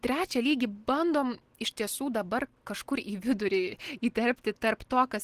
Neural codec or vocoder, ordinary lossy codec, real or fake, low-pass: none; Opus, 16 kbps; real; 14.4 kHz